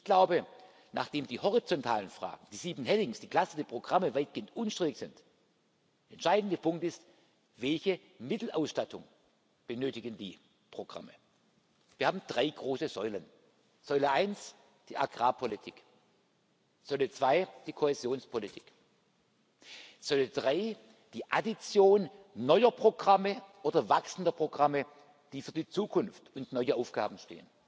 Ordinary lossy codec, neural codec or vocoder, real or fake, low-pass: none; none; real; none